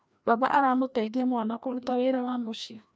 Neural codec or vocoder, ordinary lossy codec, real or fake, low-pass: codec, 16 kHz, 1 kbps, FreqCodec, larger model; none; fake; none